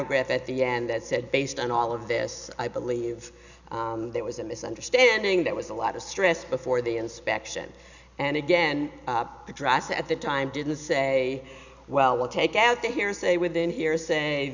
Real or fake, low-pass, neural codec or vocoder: real; 7.2 kHz; none